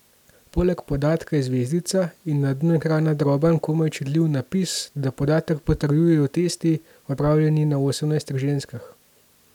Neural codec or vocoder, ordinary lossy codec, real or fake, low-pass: none; none; real; 19.8 kHz